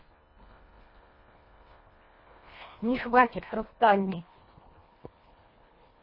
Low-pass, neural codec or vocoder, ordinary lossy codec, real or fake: 5.4 kHz; codec, 24 kHz, 1.5 kbps, HILCodec; MP3, 24 kbps; fake